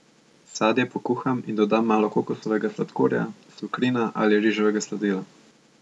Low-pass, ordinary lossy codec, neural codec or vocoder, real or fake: none; none; none; real